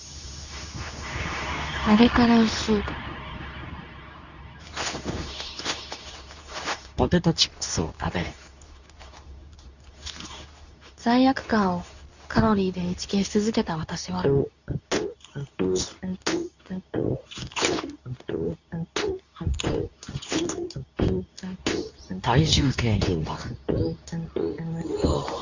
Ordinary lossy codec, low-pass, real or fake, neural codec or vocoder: none; 7.2 kHz; fake; codec, 24 kHz, 0.9 kbps, WavTokenizer, medium speech release version 2